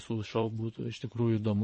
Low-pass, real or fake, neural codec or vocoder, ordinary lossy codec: 9.9 kHz; fake; vocoder, 22.05 kHz, 80 mel bands, Vocos; MP3, 32 kbps